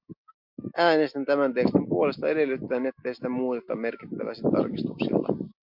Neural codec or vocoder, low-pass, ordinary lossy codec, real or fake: none; 5.4 kHz; MP3, 48 kbps; real